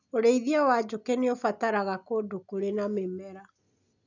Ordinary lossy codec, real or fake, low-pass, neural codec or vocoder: none; real; 7.2 kHz; none